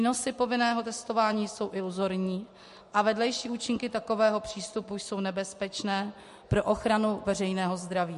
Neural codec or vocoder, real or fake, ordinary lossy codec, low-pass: none; real; MP3, 48 kbps; 14.4 kHz